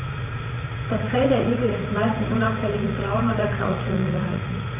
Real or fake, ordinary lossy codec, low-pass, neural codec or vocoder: fake; none; 3.6 kHz; vocoder, 44.1 kHz, 80 mel bands, Vocos